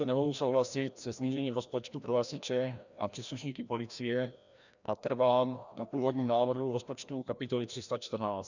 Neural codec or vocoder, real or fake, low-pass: codec, 16 kHz, 1 kbps, FreqCodec, larger model; fake; 7.2 kHz